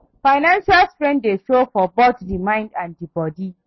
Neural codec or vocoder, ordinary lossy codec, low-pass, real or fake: none; MP3, 24 kbps; 7.2 kHz; real